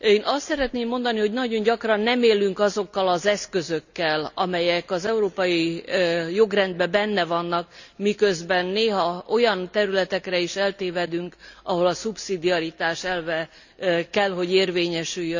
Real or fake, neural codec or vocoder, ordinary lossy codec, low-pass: real; none; none; 7.2 kHz